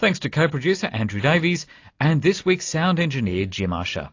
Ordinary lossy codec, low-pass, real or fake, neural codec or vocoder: AAC, 48 kbps; 7.2 kHz; real; none